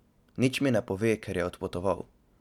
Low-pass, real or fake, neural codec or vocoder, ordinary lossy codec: 19.8 kHz; real; none; none